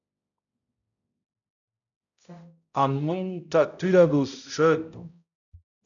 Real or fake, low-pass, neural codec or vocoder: fake; 7.2 kHz; codec, 16 kHz, 0.5 kbps, X-Codec, HuBERT features, trained on balanced general audio